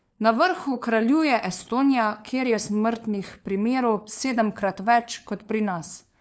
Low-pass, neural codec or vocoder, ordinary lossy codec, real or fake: none; codec, 16 kHz, 4 kbps, FunCodec, trained on LibriTTS, 50 frames a second; none; fake